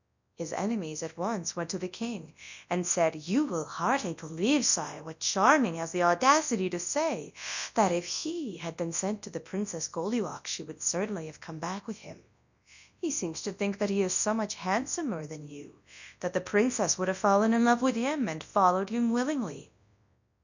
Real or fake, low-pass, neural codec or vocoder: fake; 7.2 kHz; codec, 24 kHz, 0.9 kbps, WavTokenizer, large speech release